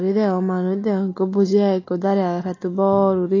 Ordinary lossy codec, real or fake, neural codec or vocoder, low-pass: AAC, 32 kbps; real; none; 7.2 kHz